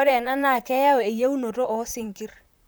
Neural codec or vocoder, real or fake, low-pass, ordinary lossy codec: vocoder, 44.1 kHz, 128 mel bands, Pupu-Vocoder; fake; none; none